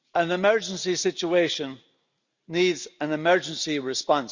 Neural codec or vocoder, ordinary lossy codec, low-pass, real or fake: none; Opus, 64 kbps; 7.2 kHz; real